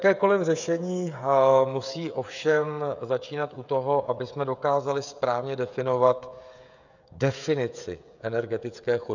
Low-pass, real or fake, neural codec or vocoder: 7.2 kHz; fake; codec, 16 kHz, 16 kbps, FreqCodec, smaller model